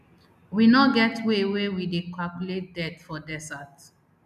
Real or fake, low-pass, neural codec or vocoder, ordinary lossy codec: real; 14.4 kHz; none; none